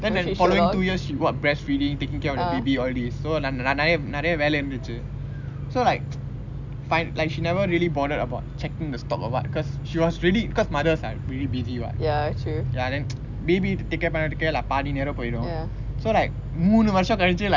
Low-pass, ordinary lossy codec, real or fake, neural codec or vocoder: 7.2 kHz; none; real; none